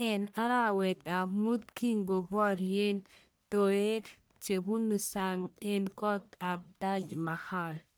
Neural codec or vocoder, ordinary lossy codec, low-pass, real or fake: codec, 44.1 kHz, 1.7 kbps, Pupu-Codec; none; none; fake